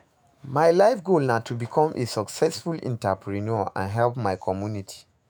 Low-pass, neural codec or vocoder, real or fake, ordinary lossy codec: none; autoencoder, 48 kHz, 128 numbers a frame, DAC-VAE, trained on Japanese speech; fake; none